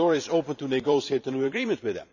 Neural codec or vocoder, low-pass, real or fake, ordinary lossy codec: vocoder, 44.1 kHz, 128 mel bands every 512 samples, BigVGAN v2; 7.2 kHz; fake; none